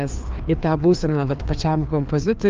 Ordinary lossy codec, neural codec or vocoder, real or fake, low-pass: Opus, 32 kbps; codec, 16 kHz, 2 kbps, FreqCodec, larger model; fake; 7.2 kHz